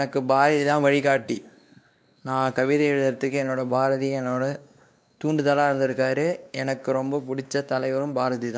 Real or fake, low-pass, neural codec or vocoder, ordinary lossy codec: fake; none; codec, 16 kHz, 2 kbps, X-Codec, WavLM features, trained on Multilingual LibriSpeech; none